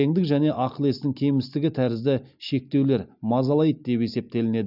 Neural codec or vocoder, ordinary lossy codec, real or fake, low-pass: none; none; real; 5.4 kHz